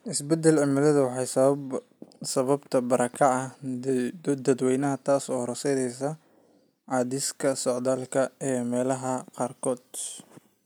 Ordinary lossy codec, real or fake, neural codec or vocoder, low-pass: none; real; none; none